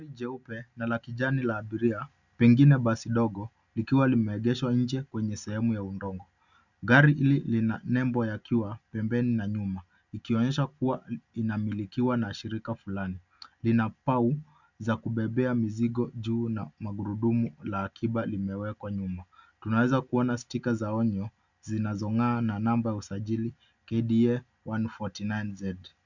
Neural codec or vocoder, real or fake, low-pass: none; real; 7.2 kHz